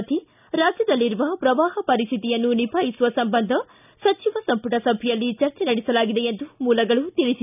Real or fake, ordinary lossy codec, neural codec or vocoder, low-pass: real; none; none; 3.6 kHz